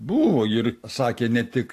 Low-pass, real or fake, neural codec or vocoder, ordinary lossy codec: 14.4 kHz; real; none; AAC, 64 kbps